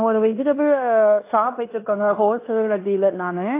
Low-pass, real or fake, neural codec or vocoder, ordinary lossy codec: 3.6 kHz; fake; codec, 16 kHz in and 24 kHz out, 0.9 kbps, LongCat-Audio-Codec, fine tuned four codebook decoder; AAC, 24 kbps